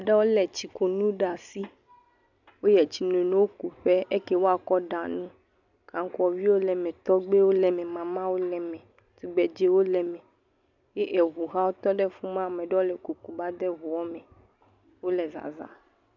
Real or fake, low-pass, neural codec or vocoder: real; 7.2 kHz; none